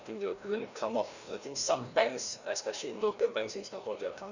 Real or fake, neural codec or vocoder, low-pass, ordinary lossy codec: fake; codec, 16 kHz, 1 kbps, FreqCodec, larger model; 7.2 kHz; none